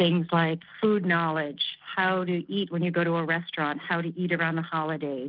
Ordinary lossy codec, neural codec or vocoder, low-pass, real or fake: Opus, 24 kbps; none; 5.4 kHz; real